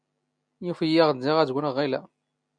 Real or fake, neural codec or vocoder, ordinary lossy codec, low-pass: real; none; MP3, 96 kbps; 9.9 kHz